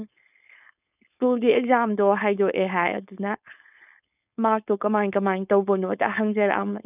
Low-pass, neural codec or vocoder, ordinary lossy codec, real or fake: 3.6 kHz; codec, 16 kHz, 4.8 kbps, FACodec; none; fake